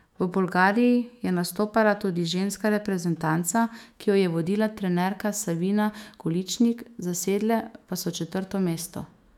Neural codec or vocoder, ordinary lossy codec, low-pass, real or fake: autoencoder, 48 kHz, 128 numbers a frame, DAC-VAE, trained on Japanese speech; none; 19.8 kHz; fake